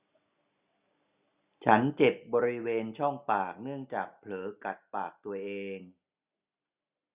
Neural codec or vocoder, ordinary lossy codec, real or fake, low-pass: none; Opus, 64 kbps; real; 3.6 kHz